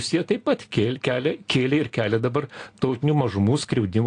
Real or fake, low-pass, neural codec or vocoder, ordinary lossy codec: real; 9.9 kHz; none; AAC, 48 kbps